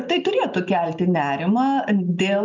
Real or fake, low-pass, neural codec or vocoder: real; 7.2 kHz; none